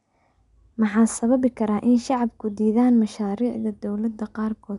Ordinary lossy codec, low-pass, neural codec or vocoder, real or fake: none; 10.8 kHz; none; real